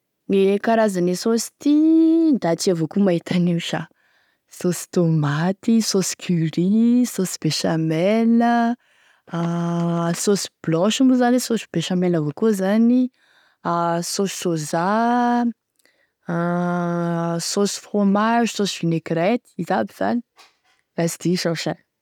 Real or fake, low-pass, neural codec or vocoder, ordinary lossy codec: real; 19.8 kHz; none; none